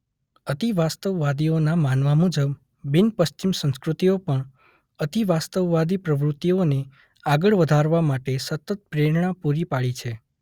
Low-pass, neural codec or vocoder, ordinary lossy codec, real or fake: 19.8 kHz; none; Opus, 64 kbps; real